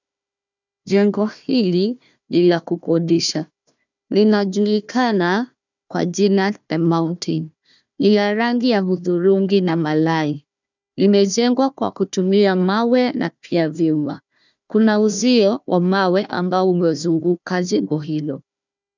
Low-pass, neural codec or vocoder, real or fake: 7.2 kHz; codec, 16 kHz, 1 kbps, FunCodec, trained on Chinese and English, 50 frames a second; fake